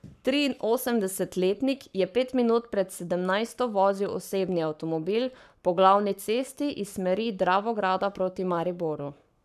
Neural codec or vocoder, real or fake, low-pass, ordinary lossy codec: codec, 44.1 kHz, 7.8 kbps, Pupu-Codec; fake; 14.4 kHz; none